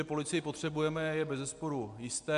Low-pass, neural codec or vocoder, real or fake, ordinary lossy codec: 10.8 kHz; none; real; MP3, 64 kbps